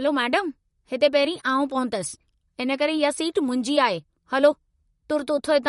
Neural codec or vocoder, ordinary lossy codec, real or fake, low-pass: vocoder, 44.1 kHz, 128 mel bands, Pupu-Vocoder; MP3, 48 kbps; fake; 19.8 kHz